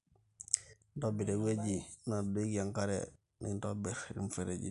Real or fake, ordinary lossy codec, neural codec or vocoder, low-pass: real; none; none; 10.8 kHz